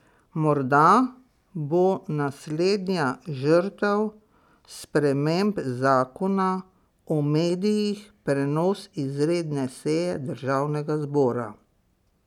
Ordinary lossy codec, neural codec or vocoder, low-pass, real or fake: none; none; 19.8 kHz; real